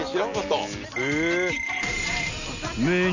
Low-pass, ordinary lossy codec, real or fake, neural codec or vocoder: 7.2 kHz; none; real; none